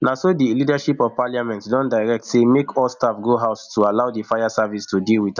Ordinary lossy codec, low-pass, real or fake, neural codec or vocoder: none; 7.2 kHz; real; none